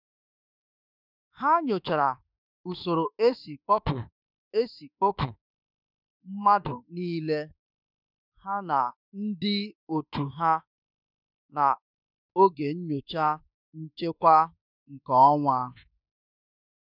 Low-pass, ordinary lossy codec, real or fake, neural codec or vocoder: 5.4 kHz; none; fake; autoencoder, 48 kHz, 32 numbers a frame, DAC-VAE, trained on Japanese speech